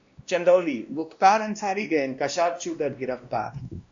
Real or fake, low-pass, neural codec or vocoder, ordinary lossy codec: fake; 7.2 kHz; codec, 16 kHz, 1 kbps, X-Codec, WavLM features, trained on Multilingual LibriSpeech; AAC, 64 kbps